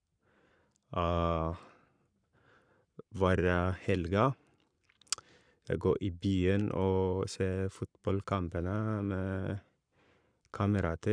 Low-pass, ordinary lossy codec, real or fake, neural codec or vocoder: 9.9 kHz; none; fake; codec, 44.1 kHz, 7.8 kbps, Pupu-Codec